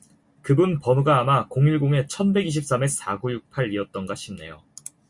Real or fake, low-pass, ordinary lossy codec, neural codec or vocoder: real; 10.8 kHz; Opus, 64 kbps; none